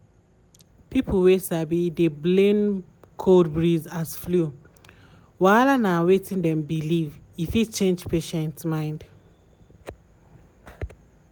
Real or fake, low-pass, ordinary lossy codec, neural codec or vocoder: real; none; none; none